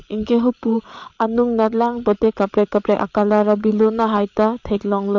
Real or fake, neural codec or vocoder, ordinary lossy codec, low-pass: fake; codec, 16 kHz, 8 kbps, FreqCodec, larger model; MP3, 48 kbps; 7.2 kHz